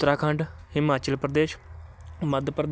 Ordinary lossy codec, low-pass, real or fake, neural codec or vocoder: none; none; real; none